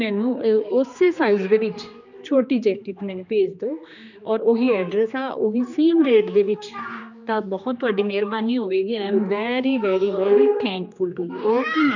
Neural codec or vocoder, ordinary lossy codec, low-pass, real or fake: codec, 16 kHz, 2 kbps, X-Codec, HuBERT features, trained on general audio; none; 7.2 kHz; fake